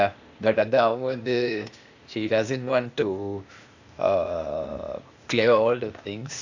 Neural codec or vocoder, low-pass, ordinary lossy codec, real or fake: codec, 16 kHz, 0.8 kbps, ZipCodec; 7.2 kHz; Opus, 64 kbps; fake